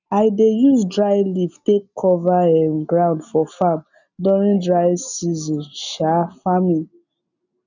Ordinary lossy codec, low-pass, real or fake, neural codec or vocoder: none; 7.2 kHz; real; none